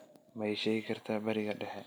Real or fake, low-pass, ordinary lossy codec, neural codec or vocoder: real; none; none; none